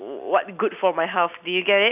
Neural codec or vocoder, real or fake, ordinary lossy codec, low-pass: none; real; none; 3.6 kHz